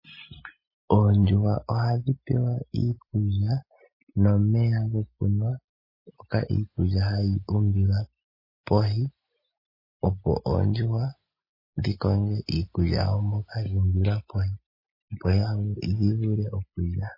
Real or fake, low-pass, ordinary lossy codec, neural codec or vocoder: real; 5.4 kHz; MP3, 24 kbps; none